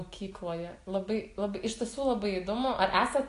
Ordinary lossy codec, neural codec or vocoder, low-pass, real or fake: AAC, 48 kbps; none; 10.8 kHz; real